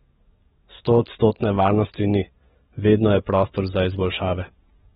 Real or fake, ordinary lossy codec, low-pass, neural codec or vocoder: fake; AAC, 16 kbps; 19.8 kHz; codec, 44.1 kHz, 7.8 kbps, DAC